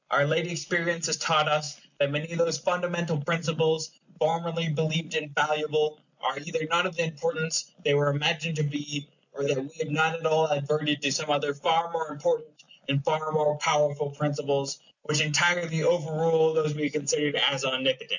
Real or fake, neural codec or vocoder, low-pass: real; none; 7.2 kHz